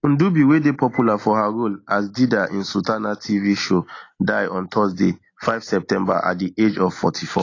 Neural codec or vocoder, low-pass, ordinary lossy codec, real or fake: none; 7.2 kHz; AAC, 32 kbps; real